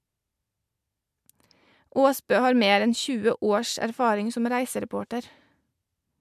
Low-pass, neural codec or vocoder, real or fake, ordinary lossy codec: 14.4 kHz; none; real; MP3, 96 kbps